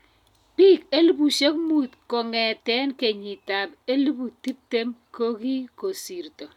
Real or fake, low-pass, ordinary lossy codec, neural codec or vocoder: real; 19.8 kHz; none; none